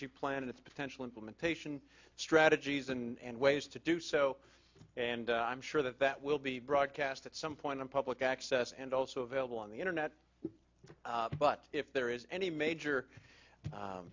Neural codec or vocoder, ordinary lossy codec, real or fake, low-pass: none; MP3, 48 kbps; real; 7.2 kHz